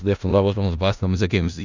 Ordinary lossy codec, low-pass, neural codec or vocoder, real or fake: none; 7.2 kHz; codec, 16 kHz in and 24 kHz out, 0.4 kbps, LongCat-Audio-Codec, four codebook decoder; fake